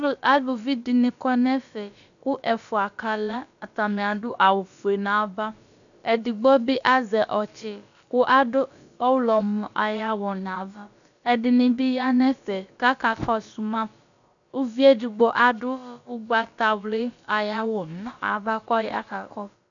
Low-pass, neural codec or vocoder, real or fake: 7.2 kHz; codec, 16 kHz, about 1 kbps, DyCAST, with the encoder's durations; fake